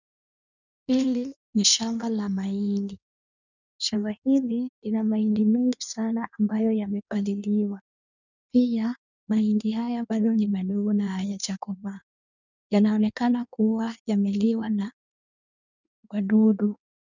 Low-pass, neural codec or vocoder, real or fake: 7.2 kHz; codec, 16 kHz in and 24 kHz out, 1.1 kbps, FireRedTTS-2 codec; fake